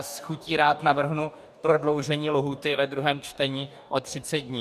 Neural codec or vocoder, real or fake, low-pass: codec, 44.1 kHz, 2.6 kbps, DAC; fake; 14.4 kHz